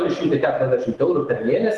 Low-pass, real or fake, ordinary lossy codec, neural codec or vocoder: 10.8 kHz; real; Opus, 16 kbps; none